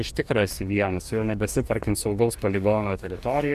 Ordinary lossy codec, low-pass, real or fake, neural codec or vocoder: Opus, 64 kbps; 14.4 kHz; fake; codec, 44.1 kHz, 2.6 kbps, DAC